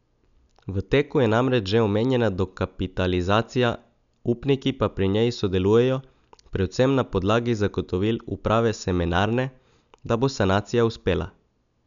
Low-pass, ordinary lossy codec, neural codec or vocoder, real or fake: 7.2 kHz; none; none; real